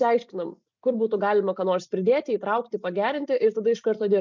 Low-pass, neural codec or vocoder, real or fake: 7.2 kHz; none; real